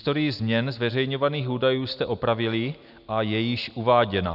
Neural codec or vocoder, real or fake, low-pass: none; real; 5.4 kHz